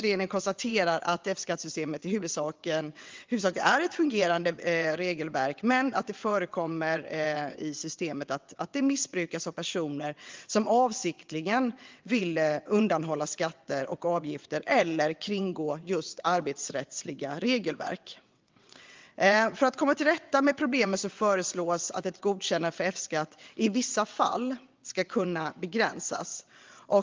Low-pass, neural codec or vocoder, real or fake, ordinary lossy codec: 7.2 kHz; vocoder, 22.05 kHz, 80 mel bands, WaveNeXt; fake; Opus, 32 kbps